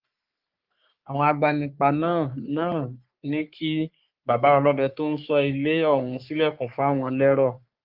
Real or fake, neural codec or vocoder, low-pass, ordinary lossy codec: fake; codec, 44.1 kHz, 3.4 kbps, Pupu-Codec; 5.4 kHz; Opus, 32 kbps